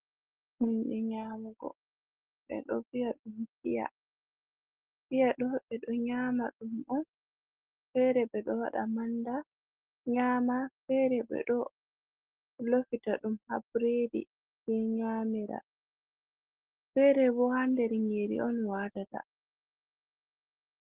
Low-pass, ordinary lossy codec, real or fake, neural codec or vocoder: 3.6 kHz; Opus, 16 kbps; real; none